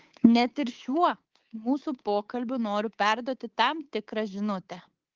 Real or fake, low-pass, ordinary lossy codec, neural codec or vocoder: fake; 7.2 kHz; Opus, 16 kbps; codec, 24 kHz, 3.1 kbps, DualCodec